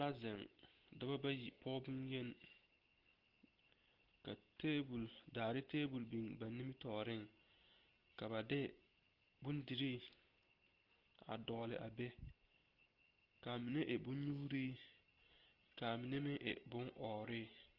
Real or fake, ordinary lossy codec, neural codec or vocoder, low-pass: real; Opus, 16 kbps; none; 5.4 kHz